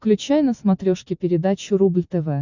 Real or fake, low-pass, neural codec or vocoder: real; 7.2 kHz; none